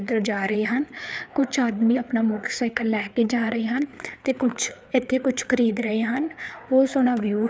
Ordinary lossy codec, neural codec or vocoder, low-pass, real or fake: none; codec, 16 kHz, 4 kbps, FreqCodec, larger model; none; fake